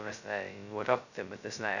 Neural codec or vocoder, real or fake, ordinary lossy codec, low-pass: codec, 16 kHz, 0.2 kbps, FocalCodec; fake; none; 7.2 kHz